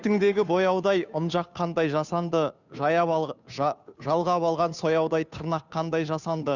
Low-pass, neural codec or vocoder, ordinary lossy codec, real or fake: 7.2 kHz; codec, 16 kHz, 2 kbps, FunCodec, trained on Chinese and English, 25 frames a second; none; fake